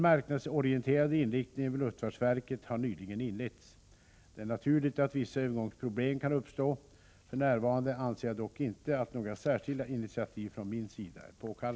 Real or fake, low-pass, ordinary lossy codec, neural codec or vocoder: real; none; none; none